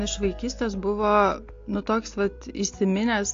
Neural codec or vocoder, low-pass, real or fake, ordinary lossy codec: none; 7.2 kHz; real; AAC, 48 kbps